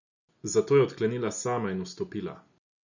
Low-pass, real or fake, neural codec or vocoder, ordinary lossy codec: 7.2 kHz; real; none; none